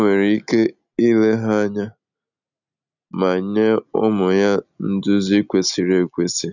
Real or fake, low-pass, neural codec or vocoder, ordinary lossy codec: real; 7.2 kHz; none; none